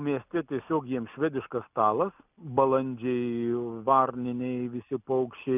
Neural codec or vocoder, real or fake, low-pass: none; real; 3.6 kHz